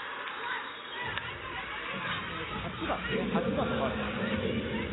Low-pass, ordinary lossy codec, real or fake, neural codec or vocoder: 7.2 kHz; AAC, 16 kbps; real; none